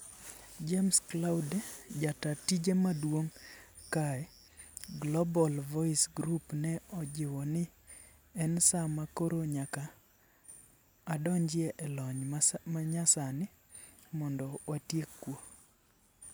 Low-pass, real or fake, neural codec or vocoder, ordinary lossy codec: none; real; none; none